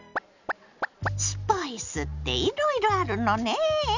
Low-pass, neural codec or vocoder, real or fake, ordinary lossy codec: 7.2 kHz; none; real; none